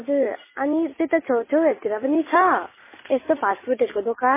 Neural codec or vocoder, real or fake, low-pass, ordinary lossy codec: none; real; 3.6 kHz; MP3, 16 kbps